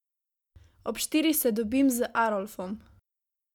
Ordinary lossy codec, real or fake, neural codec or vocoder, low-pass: none; real; none; 19.8 kHz